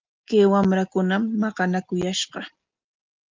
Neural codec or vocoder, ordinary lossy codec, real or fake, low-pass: none; Opus, 24 kbps; real; 7.2 kHz